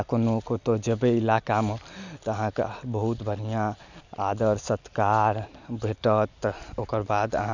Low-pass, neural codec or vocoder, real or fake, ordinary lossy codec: 7.2 kHz; none; real; none